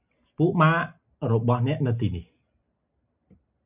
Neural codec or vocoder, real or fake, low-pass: none; real; 3.6 kHz